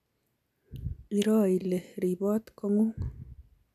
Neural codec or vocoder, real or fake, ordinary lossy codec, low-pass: none; real; none; 14.4 kHz